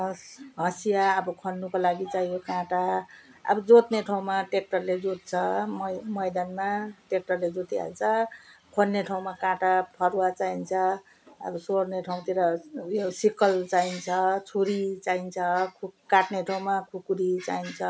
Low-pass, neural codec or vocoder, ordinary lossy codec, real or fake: none; none; none; real